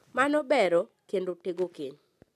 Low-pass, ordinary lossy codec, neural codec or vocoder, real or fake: 14.4 kHz; none; none; real